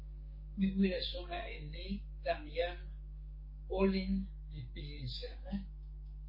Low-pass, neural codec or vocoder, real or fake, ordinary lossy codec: 5.4 kHz; codec, 44.1 kHz, 2.6 kbps, SNAC; fake; MP3, 24 kbps